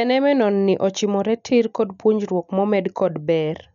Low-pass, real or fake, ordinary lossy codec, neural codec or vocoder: 7.2 kHz; real; none; none